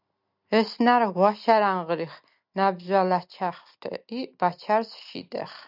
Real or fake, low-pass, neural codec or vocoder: real; 5.4 kHz; none